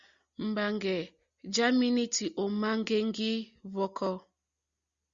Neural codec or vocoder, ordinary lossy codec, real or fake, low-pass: none; Opus, 64 kbps; real; 7.2 kHz